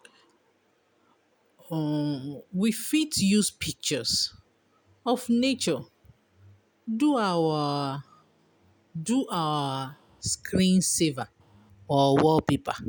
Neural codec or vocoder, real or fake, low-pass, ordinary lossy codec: none; real; none; none